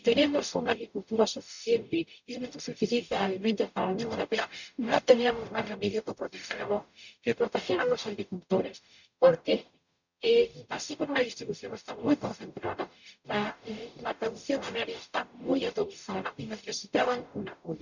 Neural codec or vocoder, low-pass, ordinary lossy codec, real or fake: codec, 44.1 kHz, 0.9 kbps, DAC; 7.2 kHz; none; fake